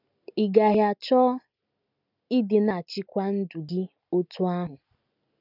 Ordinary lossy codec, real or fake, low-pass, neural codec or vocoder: none; real; 5.4 kHz; none